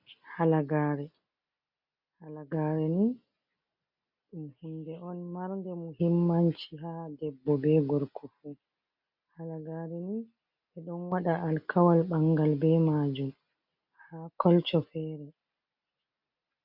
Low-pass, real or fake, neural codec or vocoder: 5.4 kHz; real; none